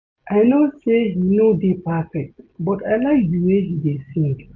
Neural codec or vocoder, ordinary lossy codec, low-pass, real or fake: none; none; 7.2 kHz; real